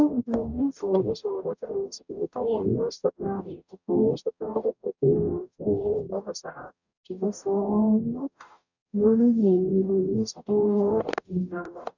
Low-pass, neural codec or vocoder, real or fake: 7.2 kHz; codec, 44.1 kHz, 0.9 kbps, DAC; fake